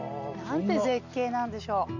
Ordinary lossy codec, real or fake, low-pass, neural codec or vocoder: none; real; 7.2 kHz; none